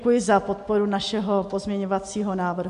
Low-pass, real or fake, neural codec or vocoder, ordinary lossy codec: 10.8 kHz; real; none; MP3, 64 kbps